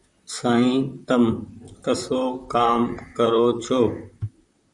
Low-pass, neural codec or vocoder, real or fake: 10.8 kHz; vocoder, 44.1 kHz, 128 mel bands, Pupu-Vocoder; fake